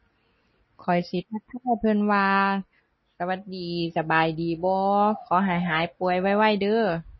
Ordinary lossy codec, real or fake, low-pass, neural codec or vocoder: MP3, 24 kbps; real; 7.2 kHz; none